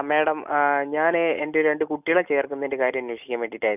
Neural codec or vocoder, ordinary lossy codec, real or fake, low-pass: none; none; real; 3.6 kHz